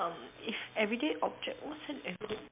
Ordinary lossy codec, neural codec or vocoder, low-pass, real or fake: MP3, 32 kbps; none; 3.6 kHz; real